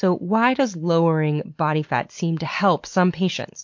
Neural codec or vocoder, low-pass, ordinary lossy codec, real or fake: none; 7.2 kHz; MP3, 48 kbps; real